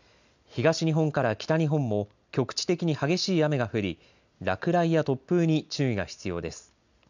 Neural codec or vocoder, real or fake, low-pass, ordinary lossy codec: none; real; 7.2 kHz; none